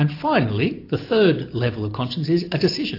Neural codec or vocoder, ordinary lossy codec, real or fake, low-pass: none; AAC, 32 kbps; real; 5.4 kHz